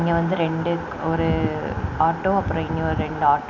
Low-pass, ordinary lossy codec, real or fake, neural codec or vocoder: 7.2 kHz; none; real; none